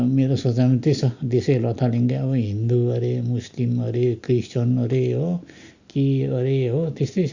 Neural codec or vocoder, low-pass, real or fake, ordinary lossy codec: none; 7.2 kHz; real; Opus, 64 kbps